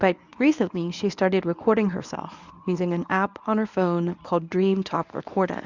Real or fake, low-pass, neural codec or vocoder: fake; 7.2 kHz; codec, 24 kHz, 0.9 kbps, WavTokenizer, medium speech release version 1